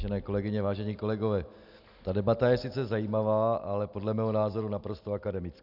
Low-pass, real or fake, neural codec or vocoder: 5.4 kHz; real; none